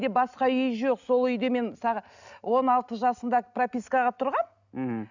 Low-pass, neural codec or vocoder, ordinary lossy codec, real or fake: 7.2 kHz; none; none; real